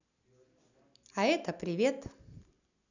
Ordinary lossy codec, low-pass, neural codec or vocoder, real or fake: none; 7.2 kHz; none; real